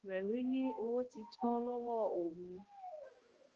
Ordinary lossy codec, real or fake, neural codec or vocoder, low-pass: Opus, 16 kbps; fake; codec, 16 kHz, 1 kbps, X-Codec, HuBERT features, trained on balanced general audio; 7.2 kHz